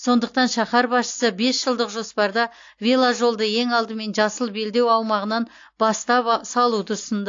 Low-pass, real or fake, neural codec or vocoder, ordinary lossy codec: 7.2 kHz; real; none; AAC, 48 kbps